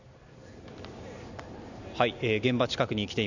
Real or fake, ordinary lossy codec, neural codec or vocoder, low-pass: real; none; none; 7.2 kHz